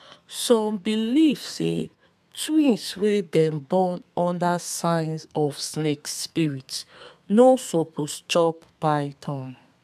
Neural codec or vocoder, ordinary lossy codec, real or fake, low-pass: codec, 32 kHz, 1.9 kbps, SNAC; none; fake; 14.4 kHz